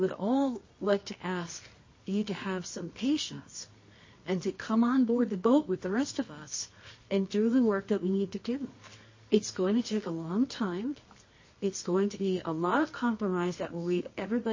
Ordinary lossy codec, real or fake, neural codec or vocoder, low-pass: MP3, 32 kbps; fake; codec, 24 kHz, 0.9 kbps, WavTokenizer, medium music audio release; 7.2 kHz